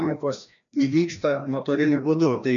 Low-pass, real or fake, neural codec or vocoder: 7.2 kHz; fake; codec, 16 kHz, 1 kbps, FreqCodec, larger model